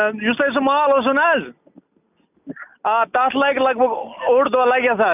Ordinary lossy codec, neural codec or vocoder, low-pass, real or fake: none; none; 3.6 kHz; real